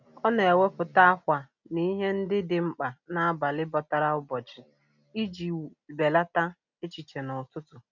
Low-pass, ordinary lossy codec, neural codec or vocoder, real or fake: 7.2 kHz; none; none; real